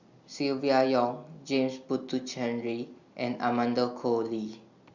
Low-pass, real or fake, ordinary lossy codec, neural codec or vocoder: 7.2 kHz; real; Opus, 64 kbps; none